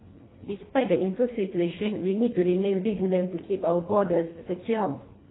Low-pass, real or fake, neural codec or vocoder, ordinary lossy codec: 7.2 kHz; fake; codec, 24 kHz, 1.5 kbps, HILCodec; AAC, 16 kbps